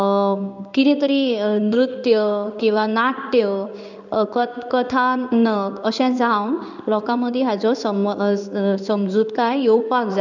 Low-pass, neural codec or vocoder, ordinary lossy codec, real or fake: 7.2 kHz; codec, 16 kHz in and 24 kHz out, 1 kbps, XY-Tokenizer; none; fake